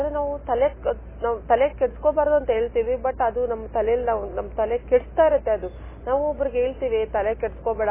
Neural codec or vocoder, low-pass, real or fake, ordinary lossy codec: none; 3.6 kHz; real; MP3, 16 kbps